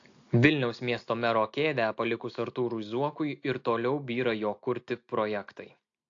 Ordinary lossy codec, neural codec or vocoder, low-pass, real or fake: AAC, 48 kbps; none; 7.2 kHz; real